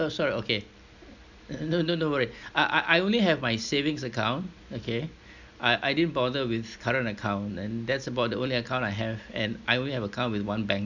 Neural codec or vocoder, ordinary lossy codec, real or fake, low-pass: none; none; real; 7.2 kHz